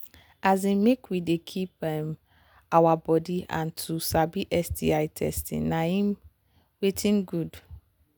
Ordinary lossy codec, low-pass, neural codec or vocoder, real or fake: none; none; none; real